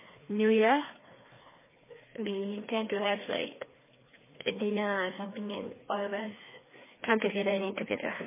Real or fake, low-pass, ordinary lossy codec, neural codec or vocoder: fake; 3.6 kHz; MP3, 16 kbps; codec, 16 kHz, 2 kbps, FreqCodec, larger model